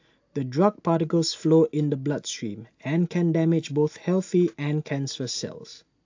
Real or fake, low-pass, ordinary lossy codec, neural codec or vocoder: real; 7.2 kHz; AAC, 48 kbps; none